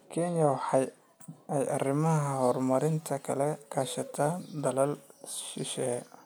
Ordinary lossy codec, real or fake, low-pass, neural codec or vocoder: none; real; none; none